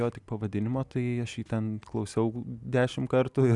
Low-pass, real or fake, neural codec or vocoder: 10.8 kHz; real; none